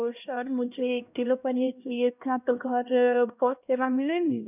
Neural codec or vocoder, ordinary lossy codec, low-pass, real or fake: codec, 16 kHz, 1 kbps, X-Codec, HuBERT features, trained on LibriSpeech; none; 3.6 kHz; fake